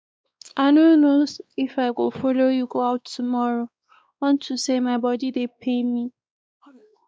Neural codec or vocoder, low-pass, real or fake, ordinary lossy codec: codec, 16 kHz, 2 kbps, X-Codec, WavLM features, trained on Multilingual LibriSpeech; none; fake; none